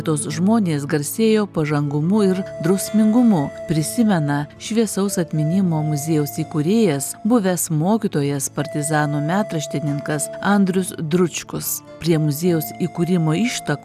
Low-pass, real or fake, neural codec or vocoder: 14.4 kHz; real; none